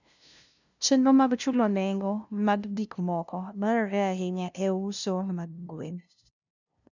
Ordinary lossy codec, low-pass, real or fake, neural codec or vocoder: none; 7.2 kHz; fake; codec, 16 kHz, 0.5 kbps, FunCodec, trained on LibriTTS, 25 frames a second